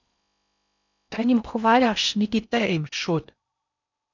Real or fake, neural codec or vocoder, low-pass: fake; codec, 16 kHz in and 24 kHz out, 0.6 kbps, FocalCodec, streaming, 4096 codes; 7.2 kHz